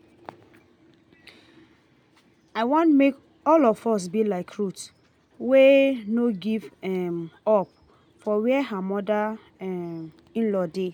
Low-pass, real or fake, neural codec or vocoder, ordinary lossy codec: 19.8 kHz; real; none; none